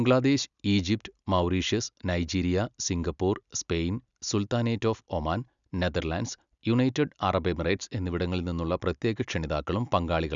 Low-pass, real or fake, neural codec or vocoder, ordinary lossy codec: 7.2 kHz; real; none; none